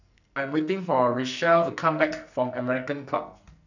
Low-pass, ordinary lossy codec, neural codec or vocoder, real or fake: 7.2 kHz; none; codec, 44.1 kHz, 2.6 kbps, SNAC; fake